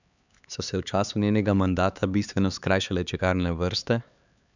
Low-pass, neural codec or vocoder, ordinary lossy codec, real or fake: 7.2 kHz; codec, 16 kHz, 4 kbps, X-Codec, HuBERT features, trained on LibriSpeech; none; fake